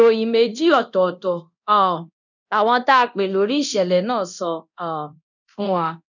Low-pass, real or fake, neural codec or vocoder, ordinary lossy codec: 7.2 kHz; fake; codec, 24 kHz, 0.9 kbps, DualCodec; none